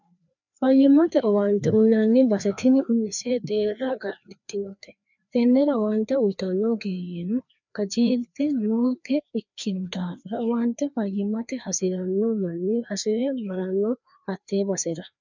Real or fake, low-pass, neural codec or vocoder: fake; 7.2 kHz; codec, 16 kHz, 2 kbps, FreqCodec, larger model